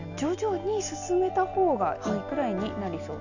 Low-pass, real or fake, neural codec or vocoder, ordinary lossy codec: 7.2 kHz; real; none; none